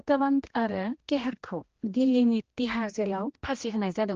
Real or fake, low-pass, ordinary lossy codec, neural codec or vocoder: fake; 7.2 kHz; Opus, 16 kbps; codec, 16 kHz, 1 kbps, X-Codec, HuBERT features, trained on general audio